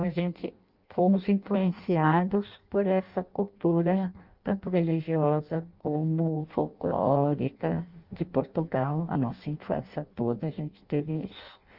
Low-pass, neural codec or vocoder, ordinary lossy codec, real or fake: 5.4 kHz; codec, 16 kHz in and 24 kHz out, 0.6 kbps, FireRedTTS-2 codec; Opus, 32 kbps; fake